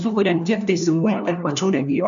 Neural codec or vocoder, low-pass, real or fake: codec, 16 kHz, 1 kbps, FunCodec, trained on LibriTTS, 50 frames a second; 7.2 kHz; fake